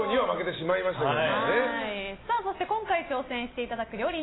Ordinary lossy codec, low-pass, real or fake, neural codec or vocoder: AAC, 16 kbps; 7.2 kHz; real; none